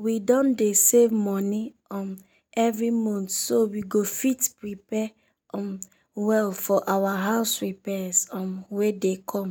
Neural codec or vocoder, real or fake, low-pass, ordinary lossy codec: none; real; none; none